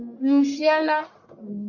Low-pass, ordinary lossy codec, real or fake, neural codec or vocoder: 7.2 kHz; MP3, 48 kbps; fake; codec, 44.1 kHz, 1.7 kbps, Pupu-Codec